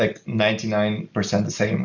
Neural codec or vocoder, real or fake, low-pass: none; real; 7.2 kHz